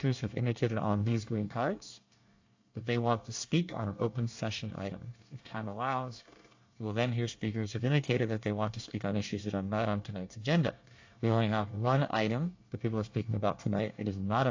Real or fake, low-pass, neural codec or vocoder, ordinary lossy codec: fake; 7.2 kHz; codec, 24 kHz, 1 kbps, SNAC; AAC, 48 kbps